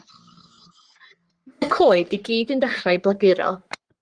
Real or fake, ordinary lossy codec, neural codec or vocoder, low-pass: fake; Opus, 24 kbps; codec, 44.1 kHz, 3.4 kbps, Pupu-Codec; 9.9 kHz